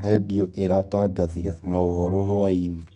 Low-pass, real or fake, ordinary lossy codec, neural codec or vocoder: 10.8 kHz; fake; none; codec, 24 kHz, 0.9 kbps, WavTokenizer, medium music audio release